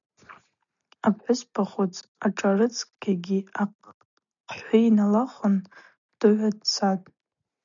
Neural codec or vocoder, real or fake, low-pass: none; real; 7.2 kHz